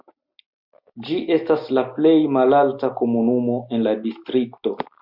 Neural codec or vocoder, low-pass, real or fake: none; 5.4 kHz; real